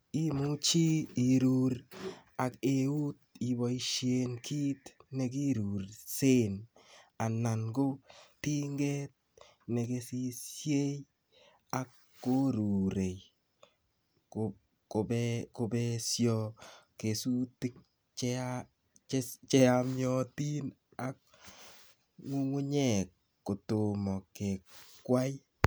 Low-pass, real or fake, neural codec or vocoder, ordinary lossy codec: none; real; none; none